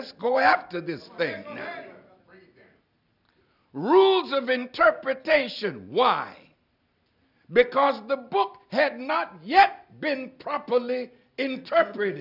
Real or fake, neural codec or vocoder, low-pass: real; none; 5.4 kHz